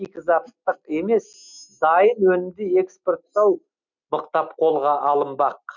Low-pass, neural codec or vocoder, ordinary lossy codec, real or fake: 7.2 kHz; none; none; real